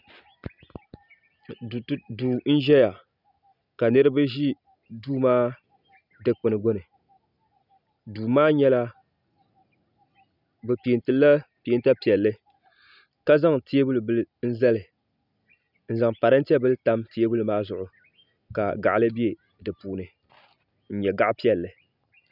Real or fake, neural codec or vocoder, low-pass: real; none; 5.4 kHz